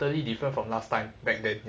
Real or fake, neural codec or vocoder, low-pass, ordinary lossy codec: real; none; none; none